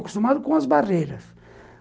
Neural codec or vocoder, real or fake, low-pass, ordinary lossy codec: none; real; none; none